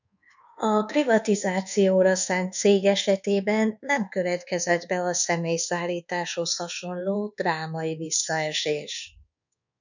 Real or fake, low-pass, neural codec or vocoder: fake; 7.2 kHz; codec, 24 kHz, 1.2 kbps, DualCodec